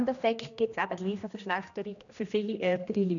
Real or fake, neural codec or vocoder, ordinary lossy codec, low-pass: fake; codec, 16 kHz, 1 kbps, X-Codec, HuBERT features, trained on general audio; none; 7.2 kHz